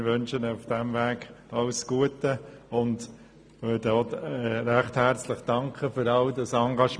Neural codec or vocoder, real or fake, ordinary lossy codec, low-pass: none; real; none; none